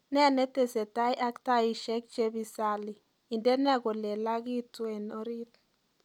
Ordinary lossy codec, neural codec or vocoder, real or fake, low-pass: none; none; real; 19.8 kHz